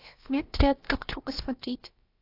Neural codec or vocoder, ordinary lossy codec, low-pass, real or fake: codec, 16 kHz, about 1 kbps, DyCAST, with the encoder's durations; AAC, 32 kbps; 5.4 kHz; fake